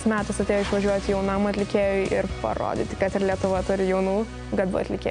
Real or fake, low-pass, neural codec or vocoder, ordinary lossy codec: real; 9.9 kHz; none; MP3, 64 kbps